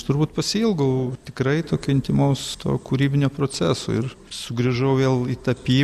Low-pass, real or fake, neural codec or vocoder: 14.4 kHz; real; none